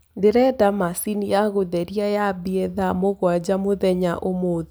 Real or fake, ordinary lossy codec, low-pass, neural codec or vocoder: real; none; none; none